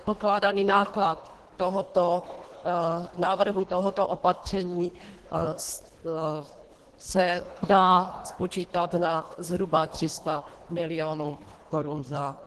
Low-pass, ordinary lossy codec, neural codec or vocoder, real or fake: 10.8 kHz; Opus, 16 kbps; codec, 24 kHz, 1.5 kbps, HILCodec; fake